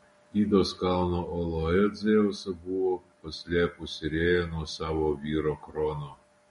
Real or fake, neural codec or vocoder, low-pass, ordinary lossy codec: real; none; 19.8 kHz; MP3, 48 kbps